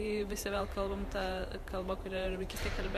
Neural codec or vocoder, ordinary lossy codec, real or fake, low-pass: vocoder, 44.1 kHz, 128 mel bands every 512 samples, BigVGAN v2; MP3, 96 kbps; fake; 14.4 kHz